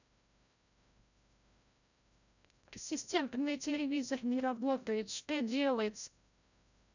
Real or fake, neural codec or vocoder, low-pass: fake; codec, 16 kHz, 0.5 kbps, FreqCodec, larger model; 7.2 kHz